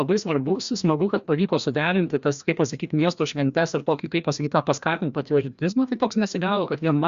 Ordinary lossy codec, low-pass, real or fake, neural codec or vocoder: Opus, 64 kbps; 7.2 kHz; fake; codec, 16 kHz, 1 kbps, FreqCodec, larger model